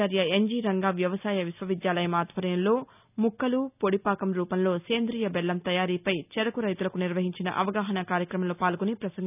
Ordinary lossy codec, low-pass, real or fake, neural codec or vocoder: none; 3.6 kHz; real; none